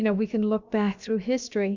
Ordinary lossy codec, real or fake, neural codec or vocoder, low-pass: Opus, 64 kbps; fake; codec, 16 kHz, about 1 kbps, DyCAST, with the encoder's durations; 7.2 kHz